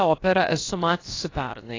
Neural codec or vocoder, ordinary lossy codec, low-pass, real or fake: codec, 16 kHz, about 1 kbps, DyCAST, with the encoder's durations; AAC, 32 kbps; 7.2 kHz; fake